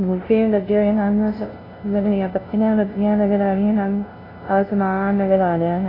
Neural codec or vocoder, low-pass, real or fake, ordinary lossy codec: codec, 16 kHz, 0.5 kbps, FunCodec, trained on LibriTTS, 25 frames a second; 5.4 kHz; fake; AAC, 24 kbps